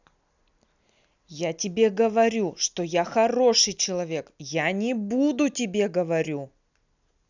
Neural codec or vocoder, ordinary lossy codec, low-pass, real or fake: none; none; 7.2 kHz; real